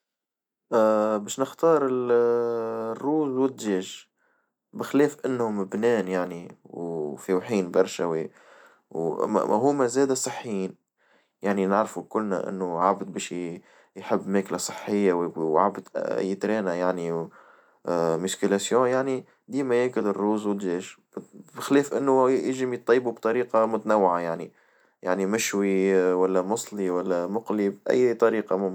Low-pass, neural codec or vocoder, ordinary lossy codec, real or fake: 19.8 kHz; none; none; real